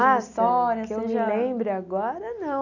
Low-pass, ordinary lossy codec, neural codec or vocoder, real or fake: 7.2 kHz; none; none; real